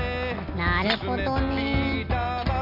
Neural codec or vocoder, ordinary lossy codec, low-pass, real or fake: none; none; 5.4 kHz; real